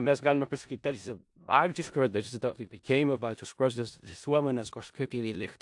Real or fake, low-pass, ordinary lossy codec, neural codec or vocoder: fake; 10.8 kHz; AAC, 64 kbps; codec, 16 kHz in and 24 kHz out, 0.4 kbps, LongCat-Audio-Codec, four codebook decoder